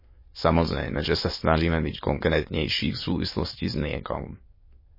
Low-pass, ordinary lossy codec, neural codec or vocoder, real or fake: 5.4 kHz; MP3, 24 kbps; autoencoder, 22.05 kHz, a latent of 192 numbers a frame, VITS, trained on many speakers; fake